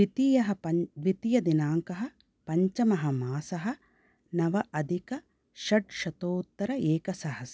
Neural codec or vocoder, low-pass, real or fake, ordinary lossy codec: none; none; real; none